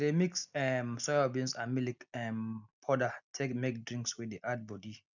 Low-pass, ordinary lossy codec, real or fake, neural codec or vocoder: 7.2 kHz; none; real; none